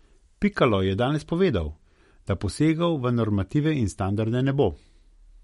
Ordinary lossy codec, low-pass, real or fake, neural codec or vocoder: MP3, 48 kbps; 19.8 kHz; real; none